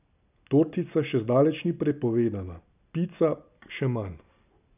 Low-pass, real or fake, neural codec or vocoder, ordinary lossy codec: 3.6 kHz; real; none; none